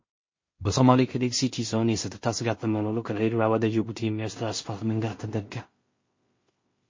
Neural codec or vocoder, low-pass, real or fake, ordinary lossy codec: codec, 16 kHz in and 24 kHz out, 0.4 kbps, LongCat-Audio-Codec, two codebook decoder; 7.2 kHz; fake; MP3, 32 kbps